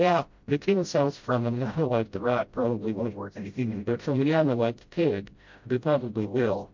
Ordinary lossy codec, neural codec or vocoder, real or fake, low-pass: MP3, 48 kbps; codec, 16 kHz, 0.5 kbps, FreqCodec, smaller model; fake; 7.2 kHz